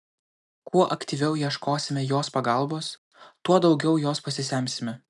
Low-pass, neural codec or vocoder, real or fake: 10.8 kHz; none; real